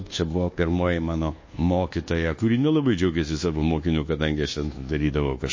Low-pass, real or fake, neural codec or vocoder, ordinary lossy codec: 7.2 kHz; fake; codec, 24 kHz, 1.2 kbps, DualCodec; MP3, 32 kbps